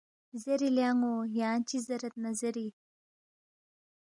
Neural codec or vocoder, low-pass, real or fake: none; 10.8 kHz; real